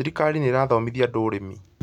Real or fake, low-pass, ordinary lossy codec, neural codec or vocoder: real; 19.8 kHz; none; none